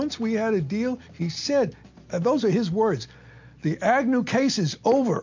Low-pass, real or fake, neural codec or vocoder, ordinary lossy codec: 7.2 kHz; real; none; MP3, 48 kbps